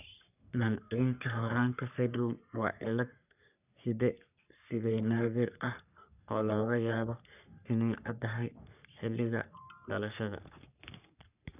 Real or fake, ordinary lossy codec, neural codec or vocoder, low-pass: fake; none; codec, 44.1 kHz, 3.4 kbps, Pupu-Codec; 3.6 kHz